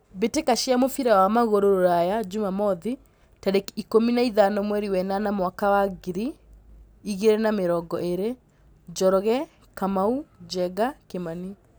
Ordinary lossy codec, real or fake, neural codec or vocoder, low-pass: none; real; none; none